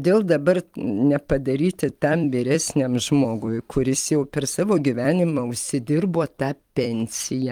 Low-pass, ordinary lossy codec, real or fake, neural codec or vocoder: 19.8 kHz; Opus, 32 kbps; fake; vocoder, 44.1 kHz, 128 mel bands every 256 samples, BigVGAN v2